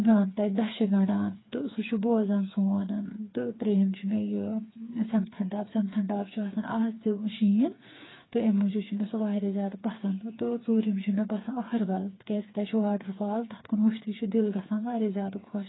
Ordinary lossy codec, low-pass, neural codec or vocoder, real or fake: AAC, 16 kbps; 7.2 kHz; codec, 16 kHz, 4 kbps, FreqCodec, smaller model; fake